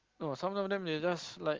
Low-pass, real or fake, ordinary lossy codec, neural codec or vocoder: 7.2 kHz; real; Opus, 16 kbps; none